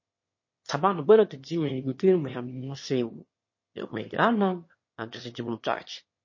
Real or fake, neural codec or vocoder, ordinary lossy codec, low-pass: fake; autoencoder, 22.05 kHz, a latent of 192 numbers a frame, VITS, trained on one speaker; MP3, 32 kbps; 7.2 kHz